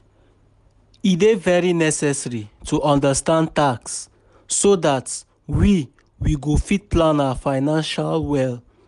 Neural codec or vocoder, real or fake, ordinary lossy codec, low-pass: none; real; none; 10.8 kHz